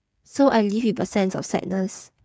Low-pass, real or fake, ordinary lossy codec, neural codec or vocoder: none; fake; none; codec, 16 kHz, 8 kbps, FreqCodec, smaller model